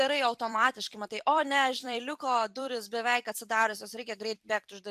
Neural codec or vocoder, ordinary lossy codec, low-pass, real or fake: vocoder, 44.1 kHz, 128 mel bands every 256 samples, BigVGAN v2; AAC, 96 kbps; 14.4 kHz; fake